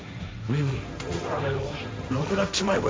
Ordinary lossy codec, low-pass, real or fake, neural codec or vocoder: none; none; fake; codec, 16 kHz, 1.1 kbps, Voila-Tokenizer